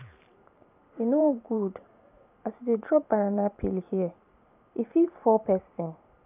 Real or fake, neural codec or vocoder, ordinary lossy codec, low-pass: fake; vocoder, 44.1 kHz, 128 mel bands every 512 samples, BigVGAN v2; none; 3.6 kHz